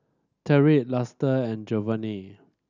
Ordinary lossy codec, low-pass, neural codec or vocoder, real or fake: none; 7.2 kHz; none; real